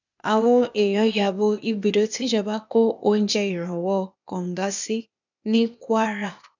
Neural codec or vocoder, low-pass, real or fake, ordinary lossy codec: codec, 16 kHz, 0.8 kbps, ZipCodec; 7.2 kHz; fake; none